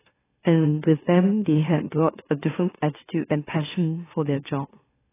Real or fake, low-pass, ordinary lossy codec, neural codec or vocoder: fake; 3.6 kHz; AAC, 16 kbps; autoencoder, 44.1 kHz, a latent of 192 numbers a frame, MeloTTS